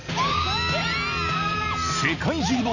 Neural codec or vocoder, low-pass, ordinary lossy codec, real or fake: none; 7.2 kHz; none; real